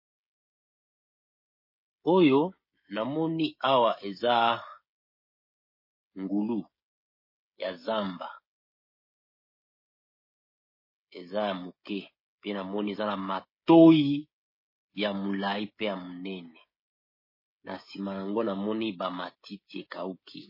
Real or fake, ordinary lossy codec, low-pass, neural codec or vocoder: fake; MP3, 24 kbps; 5.4 kHz; codec, 16 kHz, 16 kbps, FreqCodec, smaller model